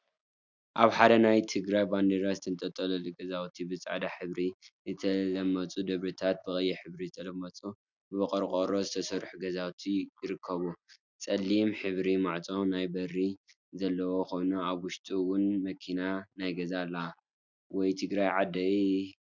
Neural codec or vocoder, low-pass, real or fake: none; 7.2 kHz; real